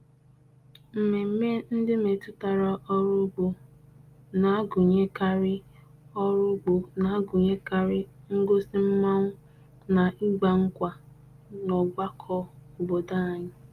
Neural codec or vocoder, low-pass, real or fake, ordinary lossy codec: none; 14.4 kHz; real; Opus, 32 kbps